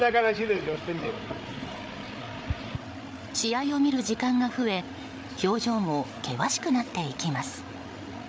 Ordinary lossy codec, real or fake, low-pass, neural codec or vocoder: none; fake; none; codec, 16 kHz, 8 kbps, FreqCodec, larger model